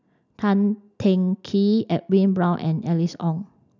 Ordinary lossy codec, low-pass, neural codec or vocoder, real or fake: none; 7.2 kHz; none; real